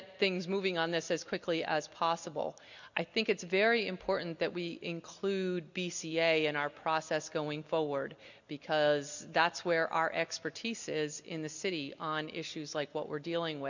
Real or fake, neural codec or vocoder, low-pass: real; none; 7.2 kHz